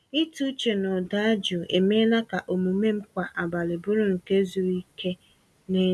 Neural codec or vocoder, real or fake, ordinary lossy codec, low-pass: none; real; none; none